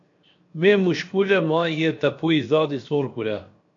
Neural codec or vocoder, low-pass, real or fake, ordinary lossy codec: codec, 16 kHz, 0.7 kbps, FocalCodec; 7.2 kHz; fake; MP3, 48 kbps